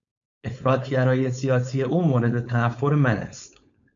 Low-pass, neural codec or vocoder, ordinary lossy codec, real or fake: 7.2 kHz; codec, 16 kHz, 4.8 kbps, FACodec; MP3, 64 kbps; fake